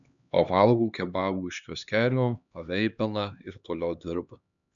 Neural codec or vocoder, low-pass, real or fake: codec, 16 kHz, 2 kbps, X-Codec, HuBERT features, trained on LibriSpeech; 7.2 kHz; fake